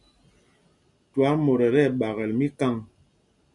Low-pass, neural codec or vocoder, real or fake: 10.8 kHz; none; real